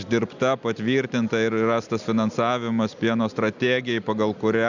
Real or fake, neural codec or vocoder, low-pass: real; none; 7.2 kHz